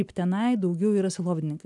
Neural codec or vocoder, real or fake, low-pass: none; real; 10.8 kHz